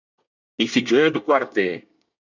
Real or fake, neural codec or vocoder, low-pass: fake; codec, 24 kHz, 1 kbps, SNAC; 7.2 kHz